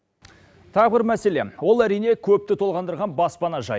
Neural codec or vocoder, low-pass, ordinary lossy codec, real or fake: none; none; none; real